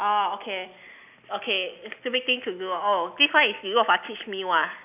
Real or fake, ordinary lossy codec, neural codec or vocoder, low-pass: real; none; none; 3.6 kHz